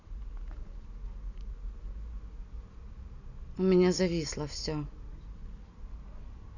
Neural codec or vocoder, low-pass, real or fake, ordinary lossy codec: none; 7.2 kHz; real; none